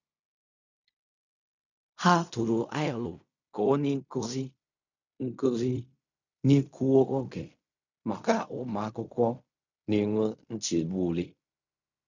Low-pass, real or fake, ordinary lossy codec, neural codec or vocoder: 7.2 kHz; fake; none; codec, 16 kHz in and 24 kHz out, 0.4 kbps, LongCat-Audio-Codec, fine tuned four codebook decoder